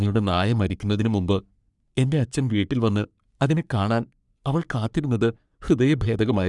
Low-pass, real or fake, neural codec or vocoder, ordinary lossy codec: 10.8 kHz; fake; codec, 44.1 kHz, 3.4 kbps, Pupu-Codec; none